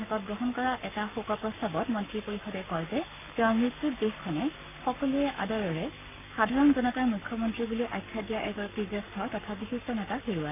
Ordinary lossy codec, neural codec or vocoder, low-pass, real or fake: none; codec, 44.1 kHz, 7.8 kbps, Pupu-Codec; 3.6 kHz; fake